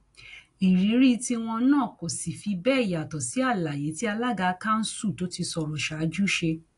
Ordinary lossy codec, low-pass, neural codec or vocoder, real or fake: AAC, 48 kbps; 10.8 kHz; none; real